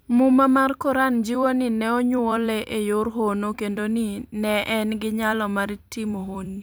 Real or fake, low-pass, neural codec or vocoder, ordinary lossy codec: fake; none; vocoder, 44.1 kHz, 128 mel bands every 256 samples, BigVGAN v2; none